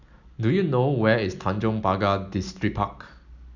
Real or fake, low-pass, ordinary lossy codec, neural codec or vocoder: real; 7.2 kHz; none; none